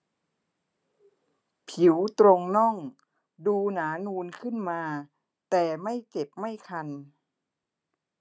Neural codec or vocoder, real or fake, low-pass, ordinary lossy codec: none; real; none; none